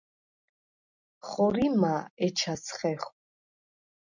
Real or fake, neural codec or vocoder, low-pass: real; none; 7.2 kHz